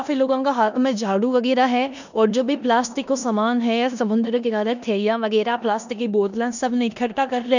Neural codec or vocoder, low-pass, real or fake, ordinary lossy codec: codec, 16 kHz in and 24 kHz out, 0.9 kbps, LongCat-Audio-Codec, four codebook decoder; 7.2 kHz; fake; none